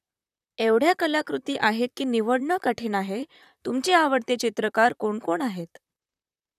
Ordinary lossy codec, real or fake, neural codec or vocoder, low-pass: none; fake; vocoder, 44.1 kHz, 128 mel bands, Pupu-Vocoder; 14.4 kHz